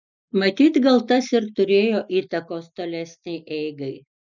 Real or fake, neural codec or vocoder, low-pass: real; none; 7.2 kHz